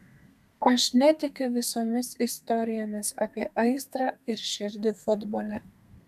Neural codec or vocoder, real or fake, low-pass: codec, 32 kHz, 1.9 kbps, SNAC; fake; 14.4 kHz